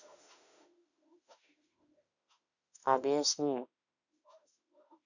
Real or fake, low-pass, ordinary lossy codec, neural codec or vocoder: fake; 7.2 kHz; none; autoencoder, 48 kHz, 32 numbers a frame, DAC-VAE, trained on Japanese speech